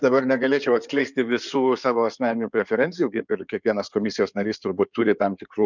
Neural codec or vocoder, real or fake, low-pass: codec, 16 kHz in and 24 kHz out, 2.2 kbps, FireRedTTS-2 codec; fake; 7.2 kHz